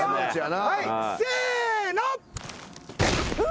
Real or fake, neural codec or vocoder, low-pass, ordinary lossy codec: real; none; none; none